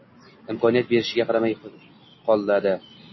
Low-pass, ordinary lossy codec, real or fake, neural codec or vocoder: 7.2 kHz; MP3, 24 kbps; real; none